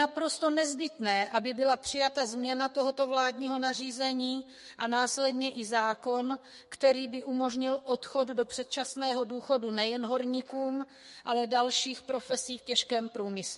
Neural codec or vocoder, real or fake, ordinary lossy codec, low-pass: codec, 32 kHz, 1.9 kbps, SNAC; fake; MP3, 48 kbps; 14.4 kHz